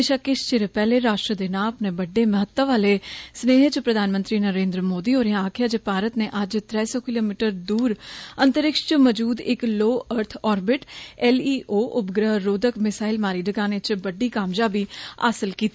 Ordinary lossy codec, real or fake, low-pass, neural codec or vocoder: none; real; none; none